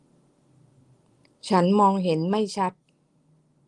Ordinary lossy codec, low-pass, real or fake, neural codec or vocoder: Opus, 24 kbps; 10.8 kHz; real; none